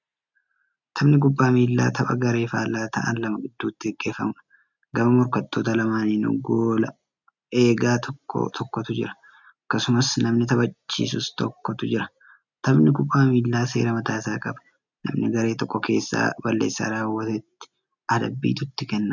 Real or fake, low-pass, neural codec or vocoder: real; 7.2 kHz; none